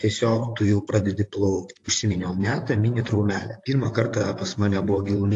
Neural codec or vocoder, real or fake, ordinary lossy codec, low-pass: vocoder, 44.1 kHz, 128 mel bands, Pupu-Vocoder; fake; AAC, 48 kbps; 10.8 kHz